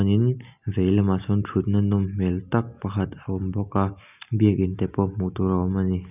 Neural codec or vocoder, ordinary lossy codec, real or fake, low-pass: none; none; real; 3.6 kHz